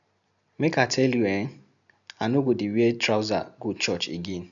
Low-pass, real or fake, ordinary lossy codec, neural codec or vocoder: 7.2 kHz; real; none; none